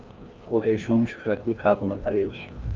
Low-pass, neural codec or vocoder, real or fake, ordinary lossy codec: 7.2 kHz; codec, 16 kHz, 1 kbps, FreqCodec, larger model; fake; Opus, 24 kbps